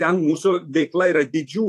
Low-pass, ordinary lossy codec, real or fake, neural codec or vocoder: 14.4 kHz; AAC, 64 kbps; fake; vocoder, 44.1 kHz, 128 mel bands, Pupu-Vocoder